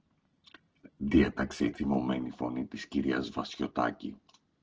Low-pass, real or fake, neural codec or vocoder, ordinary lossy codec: 7.2 kHz; real; none; Opus, 16 kbps